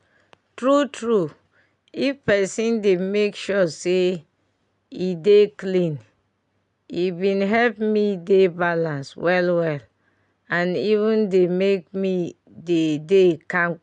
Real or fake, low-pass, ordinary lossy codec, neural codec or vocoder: real; 10.8 kHz; none; none